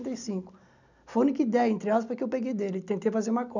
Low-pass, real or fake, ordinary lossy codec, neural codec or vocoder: 7.2 kHz; real; none; none